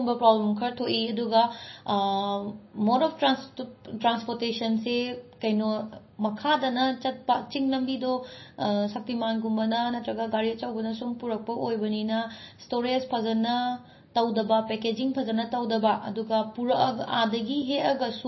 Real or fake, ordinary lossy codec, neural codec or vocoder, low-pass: real; MP3, 24 kbps; none; 7.2 kHz